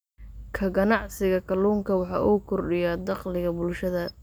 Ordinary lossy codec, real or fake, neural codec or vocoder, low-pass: none; real; none; none